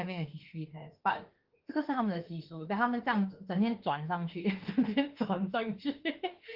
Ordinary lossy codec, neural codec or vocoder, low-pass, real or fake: Opus, 16 kbps; autoencoder, 48 kHz, 32 numbers a frame, DAC-VAE, trained on Japanese speech; 5.4 kHz; fake